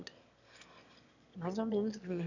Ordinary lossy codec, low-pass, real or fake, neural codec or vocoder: none; 7.2 kHz; fake; autoencoder, 22.05 kHz, a latent of 192 numbers a frame, VITS, trained on one speaker